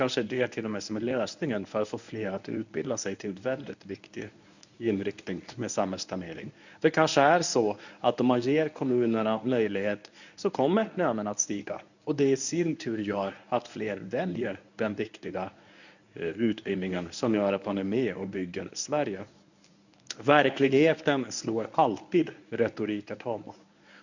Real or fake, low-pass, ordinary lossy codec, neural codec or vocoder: fake; 7.2 kHz; none; codec, 24 kHz, 0.9 kbps, WavTokenizer, medium speech release version 1